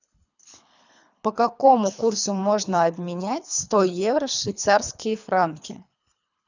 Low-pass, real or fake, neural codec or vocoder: 7.2 kHz; fake; codec, 24 kHz, 3 kbps, HILCodec